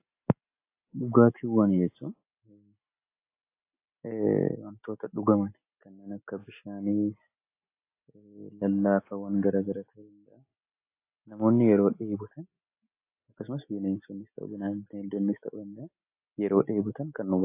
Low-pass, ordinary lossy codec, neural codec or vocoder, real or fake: 3.6 kHz; AAC, 24 kbps; none; real